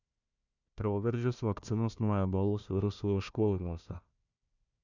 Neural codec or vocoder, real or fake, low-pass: codec, 16 kHz, 1 kbps, FunCodec, trained on Chinese and English, 50 frames a second; fake; 7.2 kHz